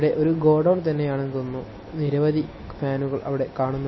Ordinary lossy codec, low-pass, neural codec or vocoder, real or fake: MP3, 24 kbps; 7.2 kHz; none; real